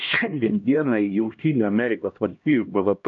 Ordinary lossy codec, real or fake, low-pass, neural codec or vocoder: AAC, 64 kbps; fake; 7.2 kHz; codec, 16 kHz, 1 kbps, X-Codec, HuBERT features, trained on LibriSpeech